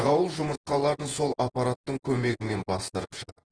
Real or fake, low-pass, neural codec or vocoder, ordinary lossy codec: fake; 9.9 kHz; vocoder, 48 kHz, 128 mel bands, Vocos; Opus, 16 kbps